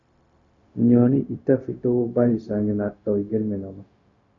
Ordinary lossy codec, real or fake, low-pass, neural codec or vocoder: MP3, 48 kbps; fake; 7.2 kHz; codec, 16 kHz, 0.4 kbps, LongCat-Audio-Codec